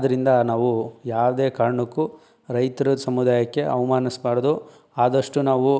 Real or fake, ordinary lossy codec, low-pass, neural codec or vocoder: real; none; none; none